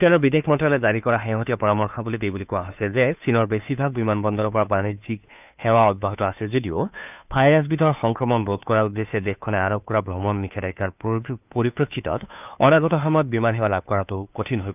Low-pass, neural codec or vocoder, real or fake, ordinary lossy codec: 3.6 kHz; codec, 16 kHz, 2 kbps, FunCodec, trained on Chinese and English, 25 frames a second; fake; none